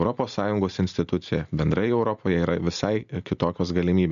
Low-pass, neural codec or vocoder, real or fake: 7.2 kHz; none; real